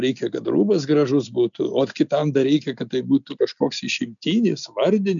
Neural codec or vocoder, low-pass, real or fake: none; 7.2 kHz; real